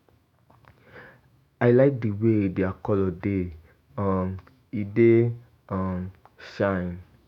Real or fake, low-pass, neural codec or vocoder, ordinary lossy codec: fake; 19.8 kHz; autoencoder, 48 kHz, 128 numbers a frame, DAC-VAE, trained on Japanese speech; none